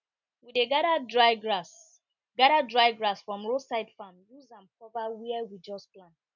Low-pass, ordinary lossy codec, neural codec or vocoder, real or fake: 7.2 kHz; none; none; real